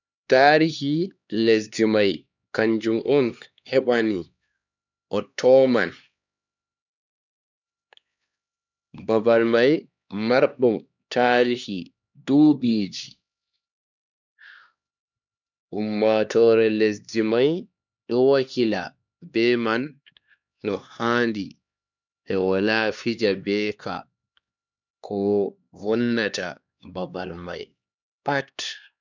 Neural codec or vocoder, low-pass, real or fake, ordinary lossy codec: codec, 16 kHz, 2 kbps, X-Codec, HuBERT features, trained on LibriSpeech; 7.2 kHz; fake; none